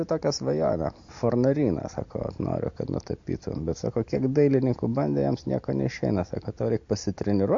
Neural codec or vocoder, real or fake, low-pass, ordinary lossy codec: none; real; 7.2 kHz; MP3, 48 kbps